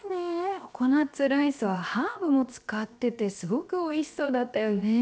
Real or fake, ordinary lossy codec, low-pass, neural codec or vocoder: fake; none; none; codec, 16 kHz, 0.7 kbps, FocalCodec